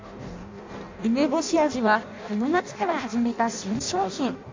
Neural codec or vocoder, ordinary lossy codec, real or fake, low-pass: codec, 16 kHz in and 24 kHz out, 0.6 kbps, FireRedTTS-2 codec; MP3, 64 kbps; fake; 7.2 kHz